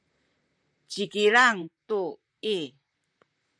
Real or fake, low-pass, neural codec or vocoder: fake; 9.9 kHz; vocoder, 44.1 kHz, 128 mel bands, Pupu-Vocoder